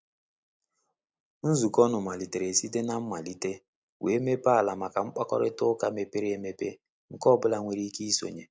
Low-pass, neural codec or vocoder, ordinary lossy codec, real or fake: none; none; none; real